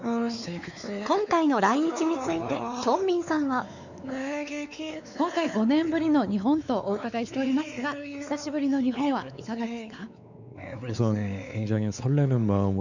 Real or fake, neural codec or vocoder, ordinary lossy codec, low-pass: fake; codec, 16 kHz, 4 kbps, X-Codec, WavLM features, trained on Multilingual LibriSpeech; none; 7.2 kHz